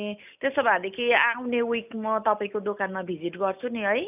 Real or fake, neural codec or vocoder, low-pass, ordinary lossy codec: real; none; 3.6 kHz; none